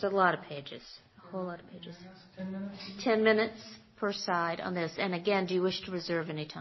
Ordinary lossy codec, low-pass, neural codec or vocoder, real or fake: MP3, 24 kbps; 7.2 kHz; none; real